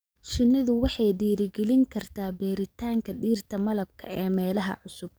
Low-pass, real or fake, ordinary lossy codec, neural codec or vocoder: none; fake; none; codec, 44.1 kHz, 7.8 kbps, DAC